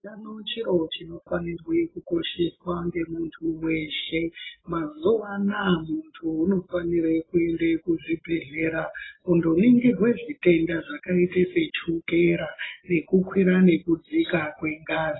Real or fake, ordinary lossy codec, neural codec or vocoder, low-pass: real; AAC, 16 kbps; none; 7.2 kHz